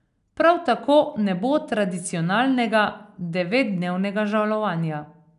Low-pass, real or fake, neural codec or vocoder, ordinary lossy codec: 10.8 kHz; real; none; none